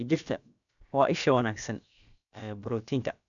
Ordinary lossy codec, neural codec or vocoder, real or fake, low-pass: none; codec, 16 kHz, about 1 kbps, DyCAST, with the encoder's durations; fake; 7.2 kHz